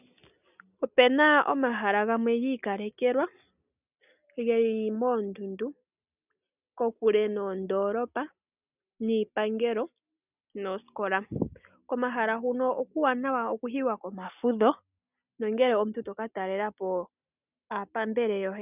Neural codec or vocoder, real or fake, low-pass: none; real; 3.6 kHz